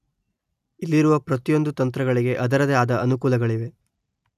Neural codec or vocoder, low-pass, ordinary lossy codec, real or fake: none; 14.4 kHz; none; real